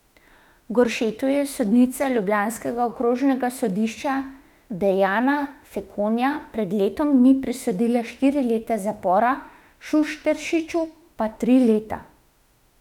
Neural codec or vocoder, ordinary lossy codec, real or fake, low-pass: autoencoder, 48 kHz, 32 numbers a frame, DAC-VAE, trained on Japanese speech; none; fake; 19.8 kHz